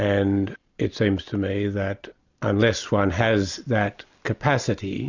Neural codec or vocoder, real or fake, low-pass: none; real; 7.2 kHz